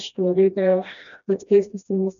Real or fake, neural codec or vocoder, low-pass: fake; codec, 16 kHz, 1 kbps, FreqCodec, smaller model; 7.2 kHz